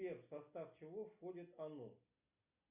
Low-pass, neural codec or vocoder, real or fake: 3.6 kHz; none; real